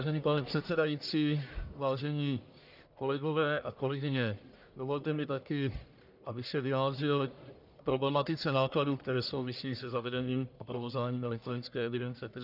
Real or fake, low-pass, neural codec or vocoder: fake; 5.4 kHz; codec, 44.1 kHz, 1.7 kbps, Pupu-Codec